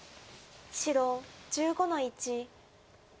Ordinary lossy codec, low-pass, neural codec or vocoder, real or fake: none; none; none; real